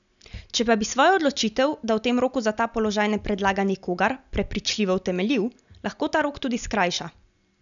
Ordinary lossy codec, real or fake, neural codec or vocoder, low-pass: none; real; none; 7.2 kHz